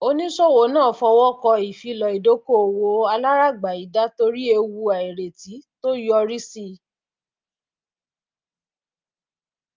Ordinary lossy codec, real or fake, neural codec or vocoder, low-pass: Opus, 24 kbps; real; none; 7.2 kHz